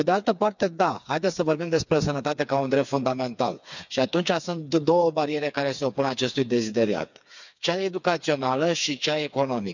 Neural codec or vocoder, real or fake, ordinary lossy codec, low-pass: codec, 16 kHz, 4 kbps, FreqCodec, smaller model; fake; none; 7.2 kHz